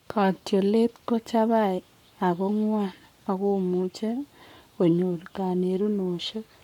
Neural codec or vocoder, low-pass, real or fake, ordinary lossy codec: codec, 44.1 kHz, 7.8 kbps, Pupu-Codec; 19.8 kHz; fake; none